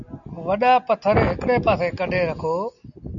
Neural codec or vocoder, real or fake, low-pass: none; real; 7.2 kHz